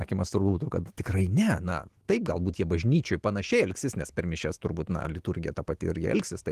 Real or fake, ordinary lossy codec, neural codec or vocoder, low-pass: fake; Opus, 16 kbps; autoencoder, 48 kHz, 128 numbers a frame, DAC-VAE, trained on Japanese speech; 14.4 kHz